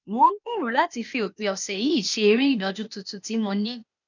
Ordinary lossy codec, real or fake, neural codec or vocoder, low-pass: none; fake; codec, 16 kHz, 0.8 kbps, ZipCodec; 7.2 kHz